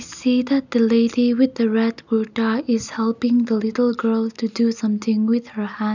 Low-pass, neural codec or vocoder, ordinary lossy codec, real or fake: 7.2 kHz; none; none; real